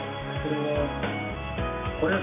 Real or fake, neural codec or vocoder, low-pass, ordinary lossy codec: real; none; 3.6 kHz; none